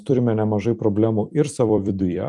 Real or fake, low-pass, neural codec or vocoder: fake; 10.8 kHz; vocoder, 44.1 kHz, 128 mel bands every 256 samples, BigVGAN v2